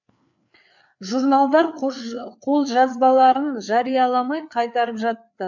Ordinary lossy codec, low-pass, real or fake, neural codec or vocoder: none; 7.2 kHz; fake; codec, 16 kHz, 4 kbps, FreqCodec, larger model